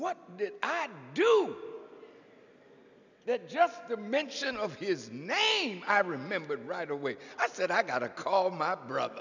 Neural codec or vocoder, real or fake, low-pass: none; real; 7.2 kHz